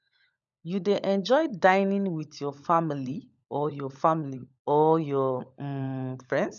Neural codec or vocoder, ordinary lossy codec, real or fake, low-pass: codec, 16 kHz, 16 kbps, FunCodec, trained on LibriTTS, 50 frames a second; none; fake; 7.2 kHz